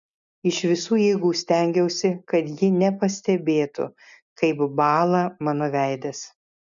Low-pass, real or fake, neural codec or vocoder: 7.2 kHz; real; none